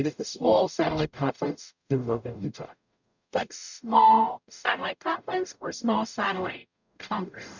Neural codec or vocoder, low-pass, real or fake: codec, 44.1 kHz, 0.9 kbps, DAC; 7.2 kHz; fake